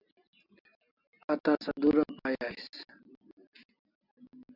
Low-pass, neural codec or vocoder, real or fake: 5.4 kHz; none; real